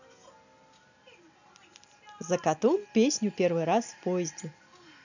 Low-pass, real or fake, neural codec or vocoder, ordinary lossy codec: 7.2 kHz; real; none; none